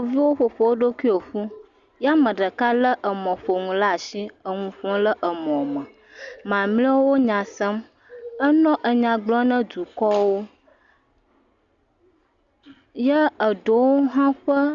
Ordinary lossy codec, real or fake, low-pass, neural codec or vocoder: Opus, 64 kbps; real; 7.2 kHz; none